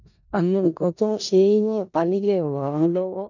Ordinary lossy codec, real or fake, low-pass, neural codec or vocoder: none; fake; 7.2 kHz; codec, 16 kHz in and 24 kHz out, 0.4 kbps, LongCat-Audio-Codec, four codebook decoder